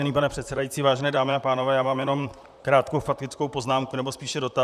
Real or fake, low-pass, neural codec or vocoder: fake; 14.4 kHz; vocoder, 44.1 kHz, 128 mel bands, Pupu-Vocoder